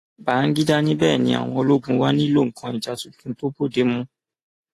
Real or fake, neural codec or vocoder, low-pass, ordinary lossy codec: real; none; 14.4 kHz; AAC, 48 kbps